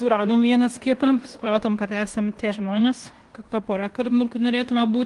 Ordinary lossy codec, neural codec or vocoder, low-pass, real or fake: Opus, 24 kbps; codec, 16 kHz in and 24 kHz out, 0.9 kbps, LongCat-Audio-Codec, fine tuned four codebook decoder; 10.8 kHz; fake